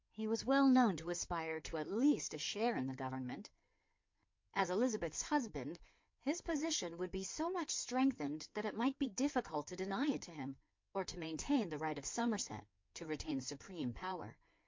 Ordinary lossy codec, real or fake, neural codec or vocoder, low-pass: MP3, 48 kbps; fake; codec, 16 kHz in and 24 kHz out, 2.2 kbps, FireRedTTS-2 codec; 7.2 kHz